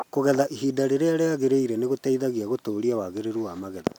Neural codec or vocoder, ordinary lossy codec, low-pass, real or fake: none; none; 19.8 kHz; real